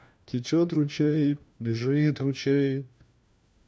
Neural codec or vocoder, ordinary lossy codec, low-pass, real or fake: codec, 16 kHz, 1 kbps, FunCodec, trained on LibriTTS, 50 frames a second; none; none; fake